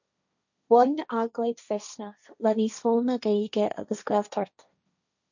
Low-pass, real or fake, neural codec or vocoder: 7.2 kHz; fake; codec, 16 kHz, 1.1 kbps, Voila-Tokenizer